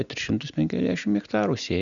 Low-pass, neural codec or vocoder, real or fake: 7.2 kHz; none; real